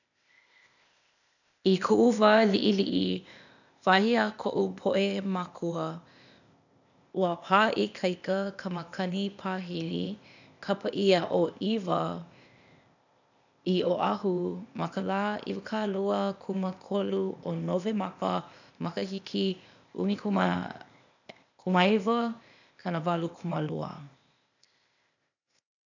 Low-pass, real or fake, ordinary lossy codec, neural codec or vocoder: 7.2 kHz; fake; none; codec, 16 kHz, 0.8 kbps, ZipCodec